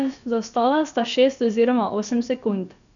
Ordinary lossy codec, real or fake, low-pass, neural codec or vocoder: none; fake; 7.2 kHz; codec, 16 kHz, about 1 kbps, DyCAST, with the encoder's durations